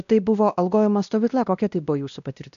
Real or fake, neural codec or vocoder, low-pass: fake; codec, 16 kHz, 1 kbps, X-Codec, WavLM features, trained on Multilingual LibriSpeech; 7.2 kHz